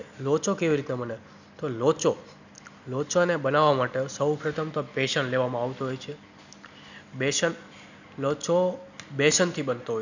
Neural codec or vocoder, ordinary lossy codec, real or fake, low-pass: none; none; real; 7.2 kHz